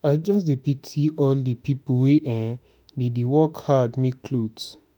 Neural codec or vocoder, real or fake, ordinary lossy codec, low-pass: autoencoder, 48 kHz, 32 numbers a frame, DAC-VAE, trained on Japanese speech; fake; none; none